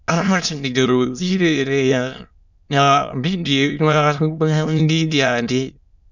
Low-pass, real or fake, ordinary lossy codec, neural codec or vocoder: 7.2 kHz; fake; none; autoencoder, 22.05 kHz, a latent of 192 numbers a frame, VITS, trained on many speakers